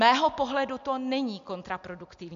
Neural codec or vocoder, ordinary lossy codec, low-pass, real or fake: none; AAC, 64 kbps; 7.2 kHz; real